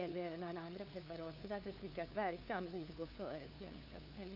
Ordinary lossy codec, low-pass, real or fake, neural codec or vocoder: none; 5.4 kHz; fake; codec, 16 kHz, 2 kbps, FunCodec, trained on LibriTTS, 25 frames a second